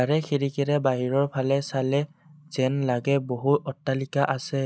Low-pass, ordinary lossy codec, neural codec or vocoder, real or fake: none; none; none; real